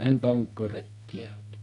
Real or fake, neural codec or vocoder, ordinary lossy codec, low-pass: fake; codec, 24 kHz, 0.9 kbps, WavTokenizer, medium music audio release; none; 10.8 kHz